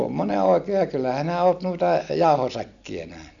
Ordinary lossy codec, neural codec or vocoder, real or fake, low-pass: none; none; real; 7.2 kHz